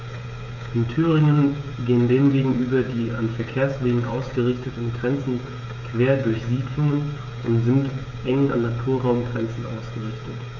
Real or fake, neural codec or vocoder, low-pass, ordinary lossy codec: fake; codec, 16 kHz, 16 kbps, FreqCodec, smaller model; 7.2 kHz; none